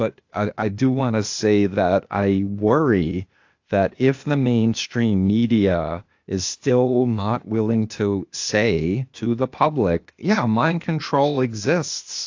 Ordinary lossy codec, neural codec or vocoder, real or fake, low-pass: AAC, 48 kbps; codec, 16 kHz, 0.8 kbps, ZipCodec; fake; 7.2 kHz